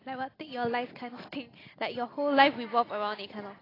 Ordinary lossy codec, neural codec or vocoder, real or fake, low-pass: AAC, 24 kbps; none; real; 5.4 kHz